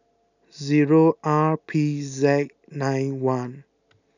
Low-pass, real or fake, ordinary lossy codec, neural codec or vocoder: 7.2 kHz; real; none; none